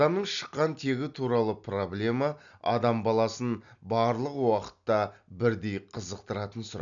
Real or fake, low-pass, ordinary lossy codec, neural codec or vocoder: real; 7.2 kHz; none; none